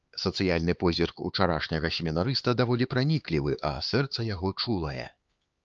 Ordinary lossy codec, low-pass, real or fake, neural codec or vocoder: Opus, 24 kbps; 7.2 kHz; fake; codec, 16 kHz, 4 kbps, X-Codec, HuBERT features, trained on LibriSpeech